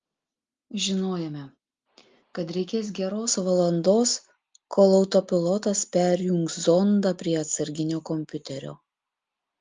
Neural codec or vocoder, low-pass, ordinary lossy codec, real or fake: none; 7.2 kHz; Opus, 32 kbps; real